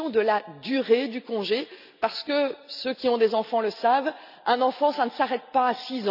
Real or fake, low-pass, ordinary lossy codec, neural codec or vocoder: real; 5.4 kHz; none; none